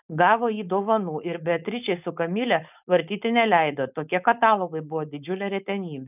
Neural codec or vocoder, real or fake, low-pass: codec, 16 kHz, 4.8 kbps, FACodec; fake; 3.6 kHz